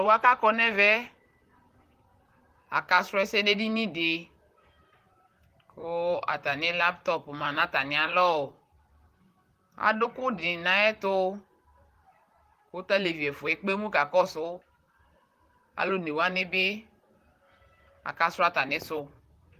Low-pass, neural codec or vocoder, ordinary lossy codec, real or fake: 14.4 kHz; vocoder, 44.1 kHz, 128 mel bands, Pupu-Vocoder; Opus, 24 kbps; fake